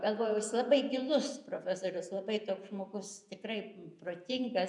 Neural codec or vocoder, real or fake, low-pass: vocoder, 48 kHz, 128 mel bands, Vocos; fake; 10.8 kHz